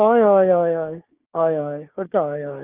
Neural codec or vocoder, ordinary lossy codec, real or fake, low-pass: codec, 16 kHz, 6 kbps, DAC; Opus, 24 kbps; fake; 3.6 kHz